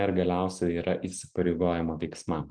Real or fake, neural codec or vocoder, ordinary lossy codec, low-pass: real; none; Opus, 24 kbps; 9.9 kHz